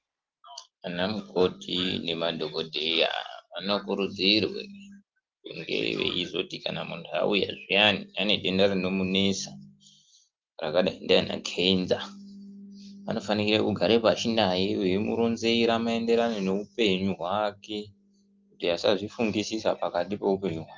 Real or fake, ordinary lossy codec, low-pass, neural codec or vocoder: real; Opus, 32 kbps; 7.2 kHz; none